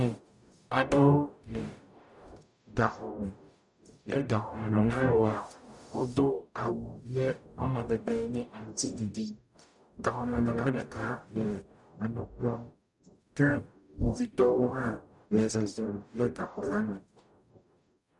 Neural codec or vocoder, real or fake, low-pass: codec, 44.1 kHz, 0.9 kbps, DAC; fake; 10.8 kHz